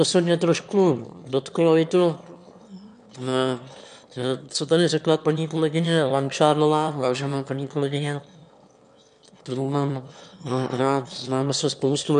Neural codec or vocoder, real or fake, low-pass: autoencoder, 22.05 kHz, a latent of 192 numbers a frame, VITS, trained on one speaker; fake; 9.9 kHz